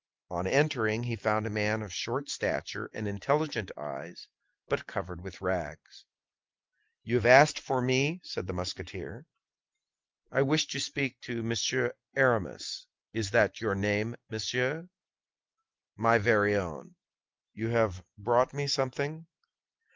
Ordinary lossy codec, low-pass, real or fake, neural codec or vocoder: Opus, 24 kbps; 7.2 kHz; real; none